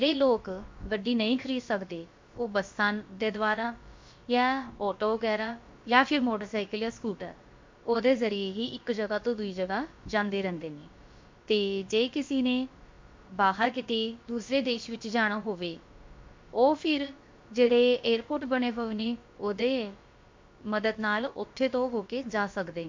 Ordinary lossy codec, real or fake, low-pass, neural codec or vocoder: MP3, 48 kbps; fake; 7.2 kHz; codec, 16 kHz, about 1 kbps, DyCAST, with the encoder's durations